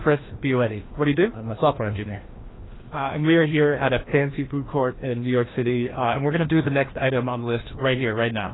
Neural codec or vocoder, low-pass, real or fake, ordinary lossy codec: codec, 16 kHz, 1 kbps, FreqCodec, larger model; 7.2 kHz; fake; AAC, 16 kbps